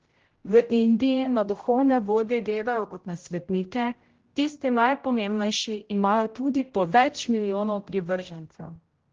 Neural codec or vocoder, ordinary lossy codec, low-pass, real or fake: codec, 16 kHz, 0.5 kbps, X-Codec, HuBERT features, trained on general audio; Opus, 16 kbps; 7.2 kHz; fake